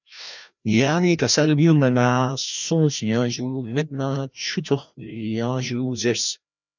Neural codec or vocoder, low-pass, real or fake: codec, 16 kHz, 1 kbps, FreqCodec, larger model; 7.2 kHz; fake